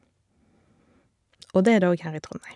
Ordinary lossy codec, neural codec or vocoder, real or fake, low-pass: none; none; real; 10.8 kHz